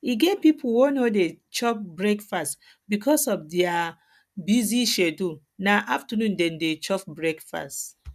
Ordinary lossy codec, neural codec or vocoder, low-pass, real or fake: none; none; 14.4 kHz; real